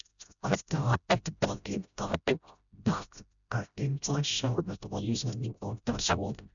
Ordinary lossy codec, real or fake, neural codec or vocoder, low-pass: none; fake; codec, 16 kHz, 0.5 kbps, FreqCodec, smaller model; 7.2 kHz